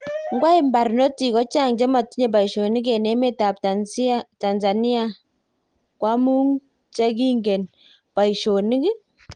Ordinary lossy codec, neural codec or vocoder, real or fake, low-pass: Opus, 24 kbps; none; real; 9.9 kHz